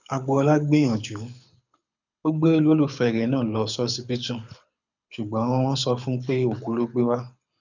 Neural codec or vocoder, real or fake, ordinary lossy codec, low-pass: codec, 24 kHz, 6 kbps, HILCodec; fake; none; 7.2 kHz